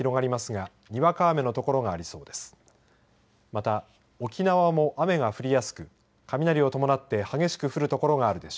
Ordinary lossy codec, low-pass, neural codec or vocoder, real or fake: none; none; none; real